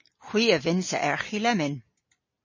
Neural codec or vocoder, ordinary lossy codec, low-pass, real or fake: none; MP3, 32 kbps; 7.2 kHz; real